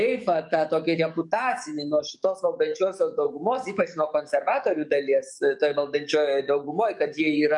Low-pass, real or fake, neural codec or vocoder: 10.8 kHz; fake; codec, 44.1 kHz, 7.8 kbps, DAC